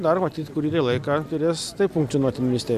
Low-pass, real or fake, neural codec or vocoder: 14.4 kHz; real; none